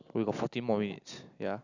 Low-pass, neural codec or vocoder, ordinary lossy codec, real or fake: 7.2 kHz; none; none; real